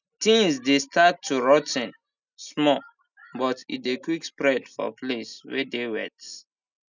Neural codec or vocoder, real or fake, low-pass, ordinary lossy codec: none; real; 7.2 kHz; none